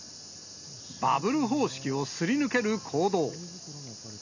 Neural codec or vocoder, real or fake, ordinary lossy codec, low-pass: none; real; none; 7.2 kHz